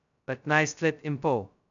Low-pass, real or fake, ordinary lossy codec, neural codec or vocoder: 7.2 kHz; fake; none; codec, 16 kHz, 0.2 kbps, FocalCodec